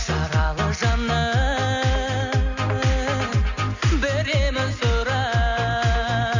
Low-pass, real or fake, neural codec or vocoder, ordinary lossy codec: 7.2 kHz; real; none; none